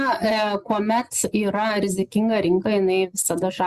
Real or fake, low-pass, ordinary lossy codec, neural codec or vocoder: real; 14.4 kHz; Opus, 64 kbps; none